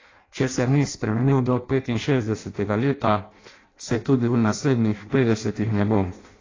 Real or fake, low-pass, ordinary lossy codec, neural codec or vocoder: fake; 7.2 kHz; AAC, 32 kbps; codec, 16 kHz in and 24 kHz out, 0.6 kbps, FireRedTTS-2 codec